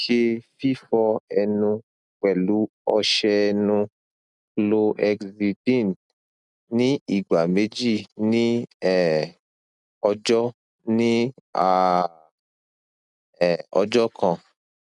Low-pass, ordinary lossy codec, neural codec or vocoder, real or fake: 10.8 kHz; none; none; real